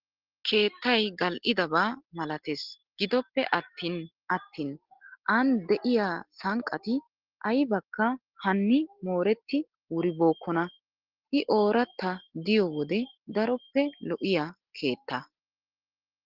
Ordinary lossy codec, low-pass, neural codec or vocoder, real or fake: Opus, 16 kbps; 5.4 kHz; none; real